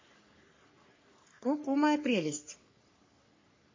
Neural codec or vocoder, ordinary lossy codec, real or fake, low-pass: codec, 44.1 kHz, 3.4 kbps, Pupu-Codec; MP3, 32 kbps; fake; 7.2 kHz